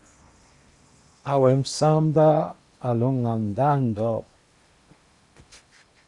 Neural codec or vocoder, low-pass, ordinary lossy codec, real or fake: codec, 16 kHz in and 24 kHz out, 0.8 kbps, FocalCodec, streaming, 65536 codes; 10.8 kHz; Opus, 64 kbps; fake